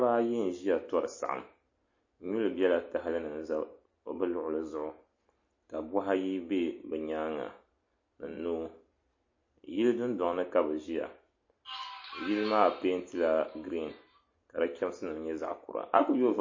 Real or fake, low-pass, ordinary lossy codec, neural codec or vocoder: real; 7.2 kHz; MP3, 32 kbps; none